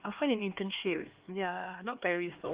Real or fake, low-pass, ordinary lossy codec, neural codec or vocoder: fake; 3.6 kHz; Opus, 32 kbps; codec, 16 kHz, 2 kbps, X-Codec, HuBERT features, trained on LibriSpeech